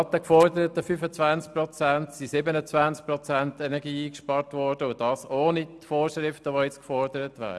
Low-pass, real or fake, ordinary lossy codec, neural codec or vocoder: none; real; none; none